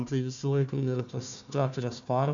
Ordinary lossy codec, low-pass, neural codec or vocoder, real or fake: MP3, 64 kbps; 7.2 kHz; codec, 16 kHz, 1 kbps, FunCodec, trained on Chinese and English, 50 frames a second; fake